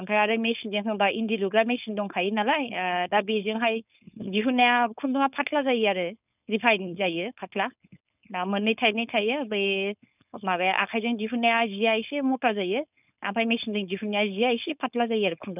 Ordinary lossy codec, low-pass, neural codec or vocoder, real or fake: none; 3.6 kHz; codec, 16 kHz, 4.8 kbps, FACodec; fake